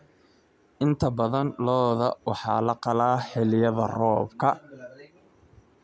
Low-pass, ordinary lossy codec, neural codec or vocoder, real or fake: none; none; none; real